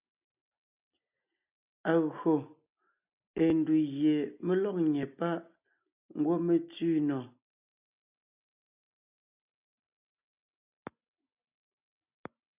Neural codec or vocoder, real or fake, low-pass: none; real; 3.6 kHz